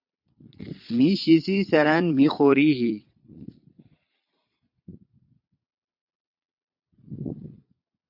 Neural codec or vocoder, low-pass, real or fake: vocoder, 22.05 kHz, 80 mel bands, Vocos; 5.4 kHz; fake